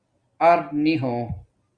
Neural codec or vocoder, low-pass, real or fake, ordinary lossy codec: none; 9.9 kHz; real; Opus, 64 kbps